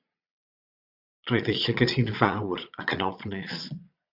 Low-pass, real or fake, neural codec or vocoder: 5.4 kHz; fake; vocoder, 22.05 kHz, 80 mel bands, Vocos